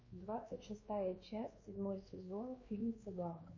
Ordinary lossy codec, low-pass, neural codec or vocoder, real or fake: MP3, 32 kbps; 7.2 kHz; codec, 16 kHz, 2 kbps, X-Codec, WavLM features, trained on Multilingual LibriSpeech; fake